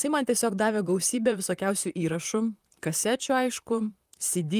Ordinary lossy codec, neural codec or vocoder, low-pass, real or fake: Opus, 32 kbps; vocoder, 44.1 kHz, 128 mel bands, Pupu-Vocoder; 14.4 kHz; fake